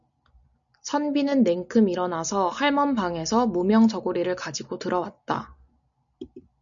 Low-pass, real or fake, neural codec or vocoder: 7.2 kHz; real; none